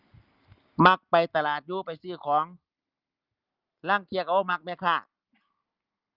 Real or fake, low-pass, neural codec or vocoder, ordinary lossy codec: real; 5.4 kHz; none; Opus, 32 kbps